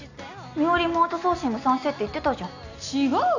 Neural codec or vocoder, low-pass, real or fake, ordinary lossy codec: none; 7.2 kHz; real; none